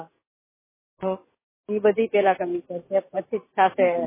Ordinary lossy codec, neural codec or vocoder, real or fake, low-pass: MP3, 16 kbps; none; real; 3.6 kHz